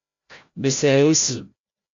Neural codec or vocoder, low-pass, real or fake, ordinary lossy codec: codec, 16 kHz, 0.5 kbps, FreqCodec, larger model; 7.2 kHz; fake; MP3, 48 kbps